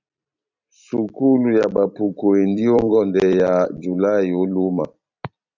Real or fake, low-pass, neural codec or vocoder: fake; 7.2 kHz; vocoder, 44.1 kHz, 128 mel bands every 256 samples, BigVGAN v2